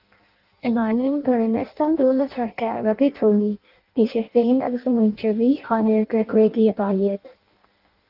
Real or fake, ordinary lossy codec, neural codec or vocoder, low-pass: fake; Opus, 32 kbps; codec, 16 kHz in and 24 kHz out, 0.6 kbps, FireRedTTS-2 codec; 5.4 kHz